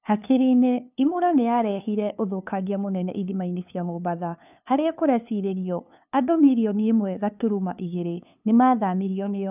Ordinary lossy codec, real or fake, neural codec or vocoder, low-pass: none; fake; codec, 16 kHz, 2 kbps, FunCodec, trained on LibriTTS, 25 frames a second; 3.6 kHz